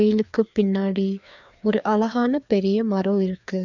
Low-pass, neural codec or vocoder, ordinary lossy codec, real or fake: 7.2 kHz; codec, 16 kHz, 2 kbps, FreqCodec, larger model; none; fake